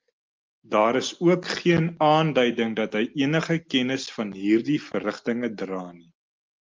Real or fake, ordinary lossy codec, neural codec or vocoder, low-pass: real; Opus, 32 kbps; none; 7.2 kHz